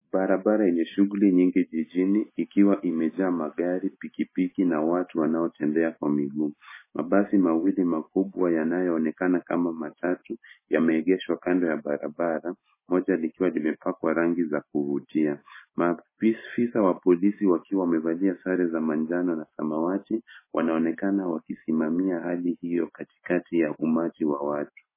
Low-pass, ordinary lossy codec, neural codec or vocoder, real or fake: 3.6 kHz; MP3, 16 kbps; none; real